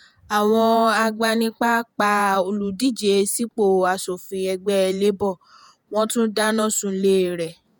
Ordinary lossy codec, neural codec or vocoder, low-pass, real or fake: none; vocoder, 48 kHz, 128 mel bands, Vocos; none; fake